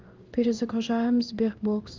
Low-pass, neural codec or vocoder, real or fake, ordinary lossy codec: 7.2 kHz; codec, 16 kHz in and 24 kHz out, 1 kbps, XY-Tokenizer; fake; Opus, 32 kbps